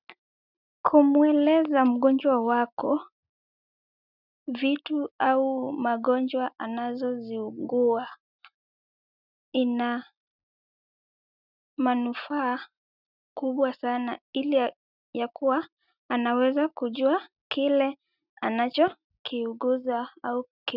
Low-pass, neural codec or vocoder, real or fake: 5.4 kHz; none; real